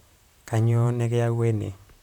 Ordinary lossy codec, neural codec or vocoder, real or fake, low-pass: none; vocoder, 44.1 kHz, 128 mel bands, Pupu-Vocoder; fake; 19.8 kHz